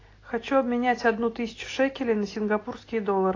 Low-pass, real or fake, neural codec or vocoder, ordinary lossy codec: 7.2 kHz; real; none; AAC, 32 kbps